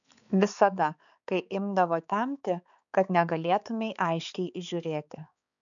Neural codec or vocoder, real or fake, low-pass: codec, 16 kHz, 4 kbps, X-Codec, HuBERT features, trained on balanced general audio; fake; 7.2 kHz